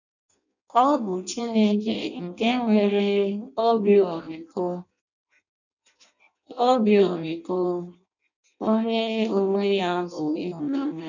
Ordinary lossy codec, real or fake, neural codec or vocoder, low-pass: none; fake; codec, 16 kHz in and 24 kHz out, 0.6 kbps, FireRedTTS-2 codec; 7.2 kHz